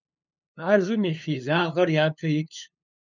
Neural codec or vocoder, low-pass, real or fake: codec, 16 kHz, 2 kbps, FunCodec, trained on LibriTTS, 25 frames a second; 7.2 kHz; fake